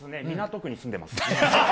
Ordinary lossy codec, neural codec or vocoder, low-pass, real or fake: none; none; none; real